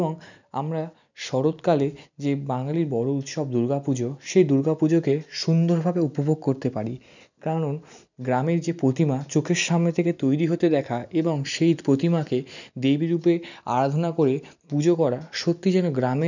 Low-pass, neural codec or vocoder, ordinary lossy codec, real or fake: 7.2 kHz; none; none; real